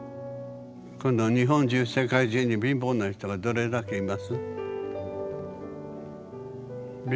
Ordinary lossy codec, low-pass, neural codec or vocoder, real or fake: none; none; none; real